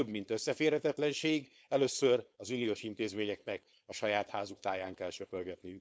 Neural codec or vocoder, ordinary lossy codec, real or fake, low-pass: codec, 16 kHz, 4.8 kbps, FACodec; none; fake; none